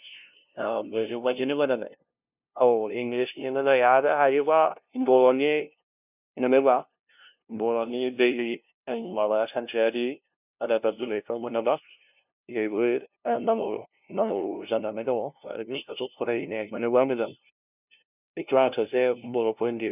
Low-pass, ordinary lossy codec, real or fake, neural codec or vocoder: 3.6 kHz; none; fake; codec, 16 kHz, 0.5 kbps, FunCodec, trained on LibriTTS, 25 frames a second